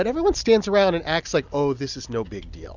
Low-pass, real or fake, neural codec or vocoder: 7.2 kHz; real; none